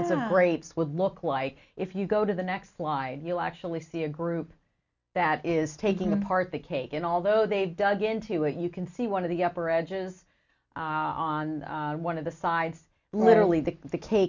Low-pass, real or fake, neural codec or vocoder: 7.2 kHz; real; none